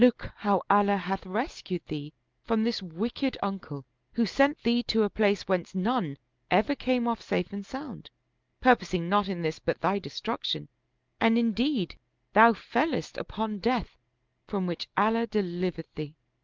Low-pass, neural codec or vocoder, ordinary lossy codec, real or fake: 7.2 kHz; none; Opus, 32 kbps; real